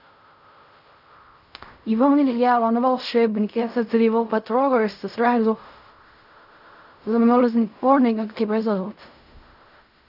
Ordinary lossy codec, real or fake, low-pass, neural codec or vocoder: none; fake; 5.4 kHz; codec, 16 kHz in and 24 kHz out, 0.4 kbps, LongCat-Audio-Codec, fine tuned four codebook decoder